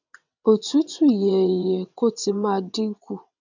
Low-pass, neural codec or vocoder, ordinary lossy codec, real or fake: 7.2 kHz; vocoder, 22.05 kHz, 80 mel bands, WaveNeXt; none; fake